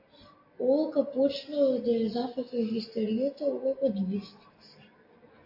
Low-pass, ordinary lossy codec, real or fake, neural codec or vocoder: 5.4 kHz; AAC, 32 kbps; real; none